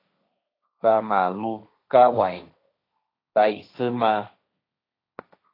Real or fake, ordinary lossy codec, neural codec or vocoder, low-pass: fake; AAC, 32 kbps; codec, 16 kHz, 1.1 kbps, Voila-Tokenizer; 5.4 kHz